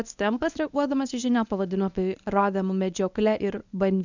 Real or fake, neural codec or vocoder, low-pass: fake; codec, 24 kHz, 0.9 kbps, WavTokenizer, medium speech release version 1; 7.2 kHz